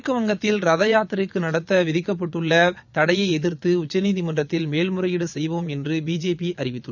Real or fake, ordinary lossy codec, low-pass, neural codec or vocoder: fake; none; 7.2 kHz; vocoder, 22.05 kHz, 80 mel bands, Vocos